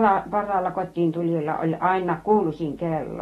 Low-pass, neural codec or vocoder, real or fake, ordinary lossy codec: 19.8 kHz; none; real; AAC, 32 kbps